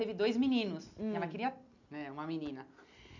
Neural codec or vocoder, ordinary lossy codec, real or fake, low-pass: none; none; real; 7.2 kHz